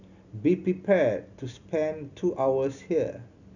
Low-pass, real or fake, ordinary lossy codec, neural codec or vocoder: 7.2 kHz; real; none; none